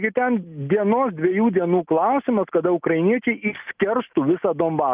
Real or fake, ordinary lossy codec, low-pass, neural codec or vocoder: real; Opus, 32 kbps; 3.6 kHz; none